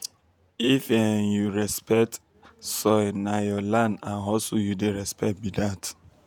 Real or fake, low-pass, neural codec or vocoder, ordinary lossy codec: fake; 19.8 kHz; vocoder, 48 kHz, 128 mel bands, Vocos; none